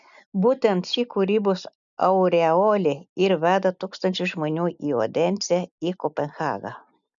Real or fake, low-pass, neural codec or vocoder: real; 7.2 kHz; none